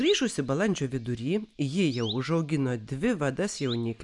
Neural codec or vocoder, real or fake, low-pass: none; real; 10.8 kHz